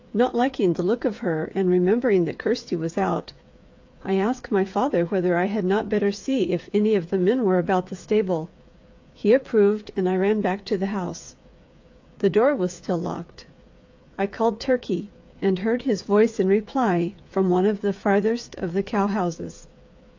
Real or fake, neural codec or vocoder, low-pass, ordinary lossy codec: fake; codec, 16 kHz, 8 kbps, FreqCodec, smaller model; 7.2 kHz; AAC, 48 kbps